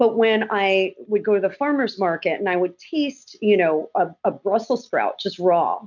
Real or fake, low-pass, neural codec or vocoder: real; 7.2 kHz; none